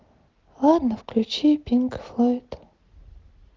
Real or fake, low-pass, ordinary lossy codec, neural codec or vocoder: real; 7.2 kHz; Opus, 16 kbps; none